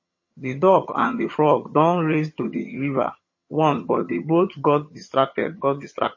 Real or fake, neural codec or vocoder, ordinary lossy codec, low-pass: fake; vocoder, 22.05 kHz, 80 mel bands, HiFi-GAN; MP3, 32 kbps; 7.2 kHz